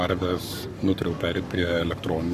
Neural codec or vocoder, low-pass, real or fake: codec, 44.1 kHz, 7.8 kbps, Pupu-Codec; 14.4 kHz; fake